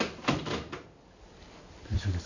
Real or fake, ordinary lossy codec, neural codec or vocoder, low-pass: real; none; none; 7.2 kHz